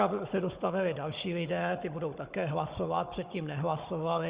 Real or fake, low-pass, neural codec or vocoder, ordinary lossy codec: fake; 3.6 kHz; codec, 16 kHz, 16 kbps, FunCodec, trained on Chinese and English, 50 frames a second; Opus, 64 kbps